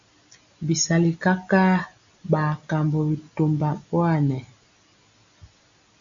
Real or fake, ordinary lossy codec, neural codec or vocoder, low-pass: real; AAC, 64 kbps; none; 7.2 kHz